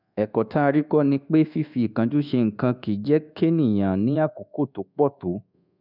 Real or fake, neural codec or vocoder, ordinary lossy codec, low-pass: fake; codec, 24 kHz, 1.2 kbps, DualCodec; none; 5.4 kHz